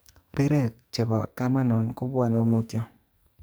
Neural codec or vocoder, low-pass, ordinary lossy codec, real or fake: codec, 44.1 kHz, 2.6 kbps, SNAC; none; none; fake